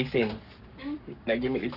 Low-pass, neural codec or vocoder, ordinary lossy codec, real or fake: 5.4 kHz; codec, 44.1 kHz, 7.8 kbps, Pupu-Codec; none; fake